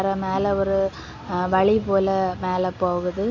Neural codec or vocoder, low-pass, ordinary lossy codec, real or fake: none; 7.2 kHz; none; real